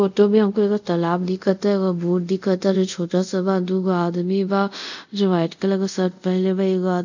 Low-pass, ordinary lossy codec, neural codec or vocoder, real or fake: 7.2 kHz; none; codec, 24 kHz, 0.5 kbps, DualCodec; fake